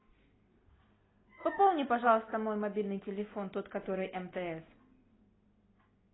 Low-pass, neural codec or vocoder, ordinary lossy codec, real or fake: 7.2 kHz; none; AAC, 16 kbps; real